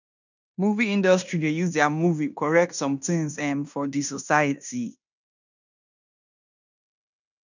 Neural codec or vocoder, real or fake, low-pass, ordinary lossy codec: codec, 16 kHz in and 24 kHz out, 0.9 kbps, LongCat-Audio-Codec, fine tuned four codebook decoder; fake; 7.2 kHz; none